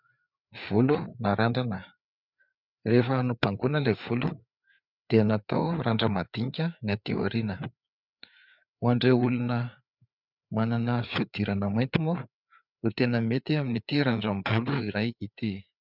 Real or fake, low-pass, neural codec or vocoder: fake; 5.4 kHz; codec, 16 kHz, 4 kbps, FreqCodec, larger model